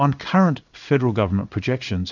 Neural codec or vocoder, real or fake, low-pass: codec, 16 kHz in and 24 kHz out, 1 kbps, XY-Tokenizer; fake; 7.2 kHz